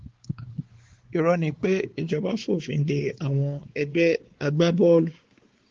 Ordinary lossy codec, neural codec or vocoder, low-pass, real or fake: Opus, 16 kbps; codec, 16 kHz, 4 kbps, X-Codec, WavLM features, trained on Multilingual LibriSpeech; 7.2 kHz; fake